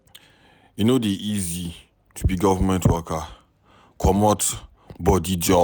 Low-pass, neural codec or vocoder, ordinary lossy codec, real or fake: none; none; none; real